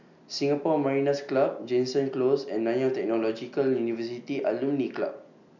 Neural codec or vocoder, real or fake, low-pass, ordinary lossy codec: none; real; 7.2 kHz; none